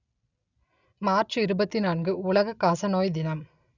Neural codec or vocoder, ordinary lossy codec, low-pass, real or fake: none; none; 7.2 kHz; real